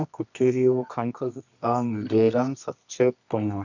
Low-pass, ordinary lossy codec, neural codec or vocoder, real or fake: 7.2 kHz; none; codec, 24 kHz, 0.9 kbps, WavTokenizer, medium music audio release; fake